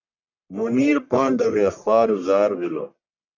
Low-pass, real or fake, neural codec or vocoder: 7.2 kHz; fake; codec, 44.1 kHz, 1.7 kbps, Pupu-Codec